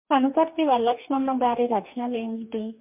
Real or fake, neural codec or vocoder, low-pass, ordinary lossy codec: fake; codec, 44.1 kHz, 2.6 kbps, DAC; 3.6 kHz; MP3, 32 kbps